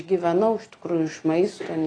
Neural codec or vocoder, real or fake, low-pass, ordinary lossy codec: none; real; 9.9 kHz; AAC, 32 kbps